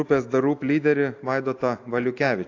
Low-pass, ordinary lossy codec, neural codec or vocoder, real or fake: 7.2 kHz; AAC, 48 kbps; none; real